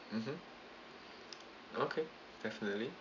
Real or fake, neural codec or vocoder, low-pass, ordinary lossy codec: real; none; 7.2 kHz; none